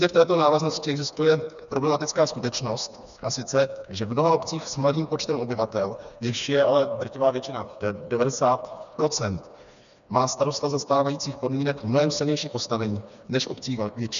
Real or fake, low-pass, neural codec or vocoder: fake; 7.2 kHz; codec, 16 kHz, 2 kbps, FreqCodec, smaller model